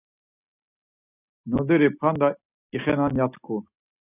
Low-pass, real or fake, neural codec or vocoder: 3.6 kHz; real; none